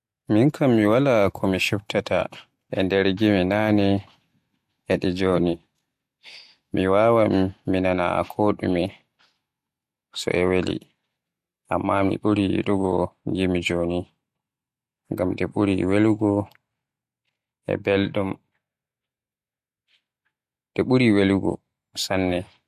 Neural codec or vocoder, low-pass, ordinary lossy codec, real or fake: none; 14.4 kHz; MP3, 64 kbps; real